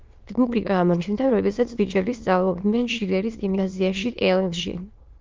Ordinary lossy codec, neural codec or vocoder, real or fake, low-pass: Opus, 32 kbps; autoencoder, 22.05 kHz, a latent of 192 numbers a frame, VITS, trained on many speakers; fake; 7.2 kHz